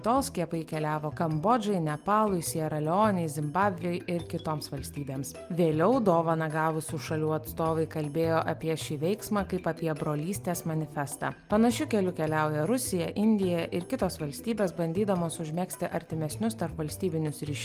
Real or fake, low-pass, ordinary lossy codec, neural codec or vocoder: real; 14.4 kHz; Opus, 32 kbps; none